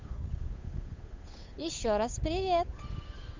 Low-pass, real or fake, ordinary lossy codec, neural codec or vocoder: 7.2 kHz; fake; MP3, 64 kbps; codec, 16 kHz, 8 kbps, FunCodec, trained on Chinese and English, 25 frames a second